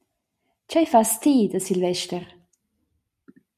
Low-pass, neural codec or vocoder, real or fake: 14.4 kHz; none; real